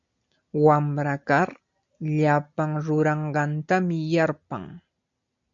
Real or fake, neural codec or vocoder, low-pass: real; none; 7.2 kHz